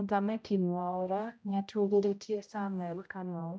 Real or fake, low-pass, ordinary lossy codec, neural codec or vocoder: fake; none; none; codec, 16 kHz, 0.5 kbps, X-Codec, HuBERT features, trained on general audio